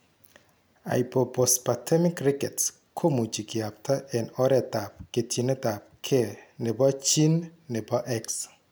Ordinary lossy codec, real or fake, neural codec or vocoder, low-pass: none; real; none; none